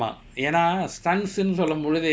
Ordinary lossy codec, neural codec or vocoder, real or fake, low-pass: none; none; real; none